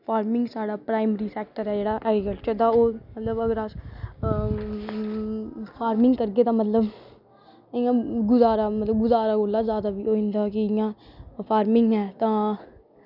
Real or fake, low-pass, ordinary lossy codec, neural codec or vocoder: real; 5.4 kHz; none; none